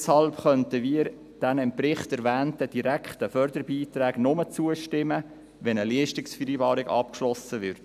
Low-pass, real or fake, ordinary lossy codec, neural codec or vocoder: 14.4 kHz; real; none; none